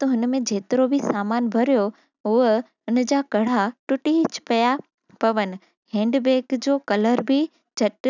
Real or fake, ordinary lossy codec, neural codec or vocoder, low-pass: real; none; none; 7.2 kHz